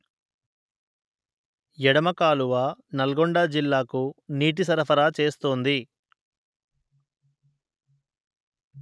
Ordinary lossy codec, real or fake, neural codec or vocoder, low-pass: none; real; none; none